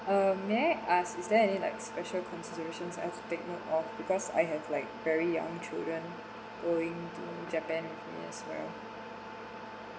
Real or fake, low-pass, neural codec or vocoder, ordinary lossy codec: real; none; none; none